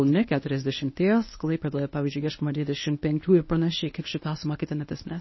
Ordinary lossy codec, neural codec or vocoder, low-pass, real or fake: MP3, 24 kbps; codec, 24 kHz, 0.9 kbps, WavTokenizer, medium speech release version 1; 7.2 kHz; fake